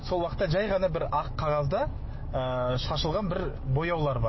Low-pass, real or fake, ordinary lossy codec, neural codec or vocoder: 7.2 kHz; fake; MP3, 24 kbps; autoencoder, 48 kHz, 128 numbers a frame, DAC-VAE, trained on Japanese speech